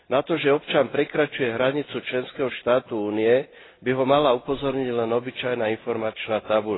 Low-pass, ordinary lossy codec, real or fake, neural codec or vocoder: 7.2 kHz; AAC, 16 kbps; real; none